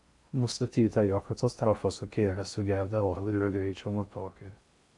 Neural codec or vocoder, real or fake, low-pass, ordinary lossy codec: codec, 16 kHz in and 24 kHz out, 0.6 kbps, FocalCodec, streaming, 4096 codes; fake; 10.8 kHz; AAC, 64 kbps